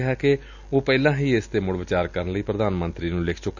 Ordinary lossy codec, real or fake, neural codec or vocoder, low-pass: none; real; none; 7.2 kHz